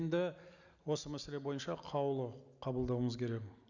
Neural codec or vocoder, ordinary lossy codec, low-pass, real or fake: none; none; 7.2 kHz; real